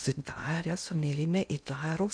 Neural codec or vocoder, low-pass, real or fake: codec, 16 kHz in and 24 kHz out, 0.6 kbps, FocalCodec, streaming, 2048 codes; 9.9 kHz; fake